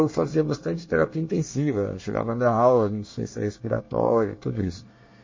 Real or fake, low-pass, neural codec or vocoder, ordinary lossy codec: fake; 7.2 kHz; codec, 24 kHz, 1 kbps, SNAC; MP3, 32 kbps